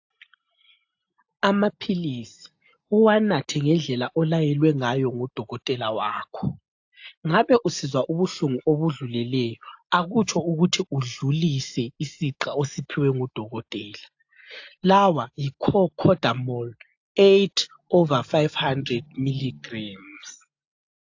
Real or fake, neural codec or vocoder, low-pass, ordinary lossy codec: real; none; 7.2 kHz; AAC, 48 kbps